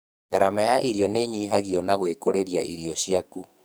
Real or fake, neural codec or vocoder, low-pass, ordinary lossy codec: fake; codec, 44.1 kHz, 2.6 kbps, SNAC; none; none